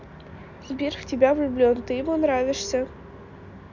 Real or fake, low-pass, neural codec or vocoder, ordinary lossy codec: real; 7.2 kHz; none; none